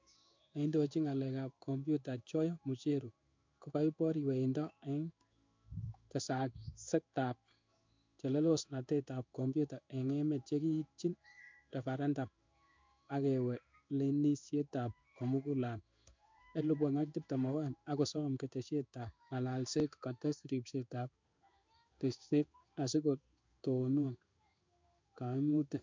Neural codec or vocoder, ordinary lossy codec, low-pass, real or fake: codec, 16 kHz in and 24 kHz out, 1 kbps, XY-Tokenizer; MP3, 64 kbps; 7.2 kHz; fake